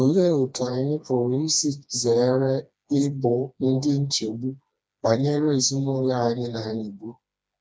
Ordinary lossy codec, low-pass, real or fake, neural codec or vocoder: none; none; fake; codec, 16 kHz, 2 kbps, FreqCodec, smaller model